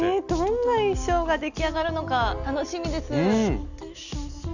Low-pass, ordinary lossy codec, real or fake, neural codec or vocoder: 7.2 kHz; none; real; none